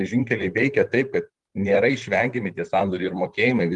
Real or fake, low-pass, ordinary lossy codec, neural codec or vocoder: fake; 10.8 kHz; Opus, 32 kbps; vocoder, 44.1 kHz, 128 mel bands, Pupu-Vocoder